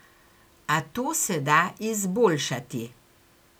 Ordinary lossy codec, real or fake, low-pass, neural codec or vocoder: none; real; none; none